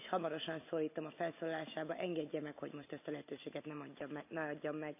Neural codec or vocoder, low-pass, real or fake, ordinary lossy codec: none; 3.6 kHz; real; AAC, 32 kbps